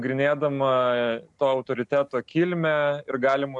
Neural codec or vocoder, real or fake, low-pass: none; real; 10.8 kHz